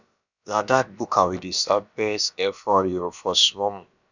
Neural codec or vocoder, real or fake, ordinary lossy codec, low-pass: codec, 16 kHz, about 1 kbps, DyCAST, with the encoder's durations; fake; none; 7.2 kHz